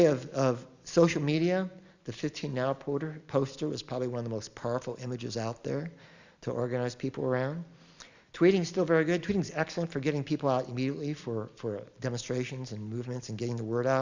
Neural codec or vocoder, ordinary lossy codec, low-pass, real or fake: none; Opus, 64 kbps; 7.2 kHz; real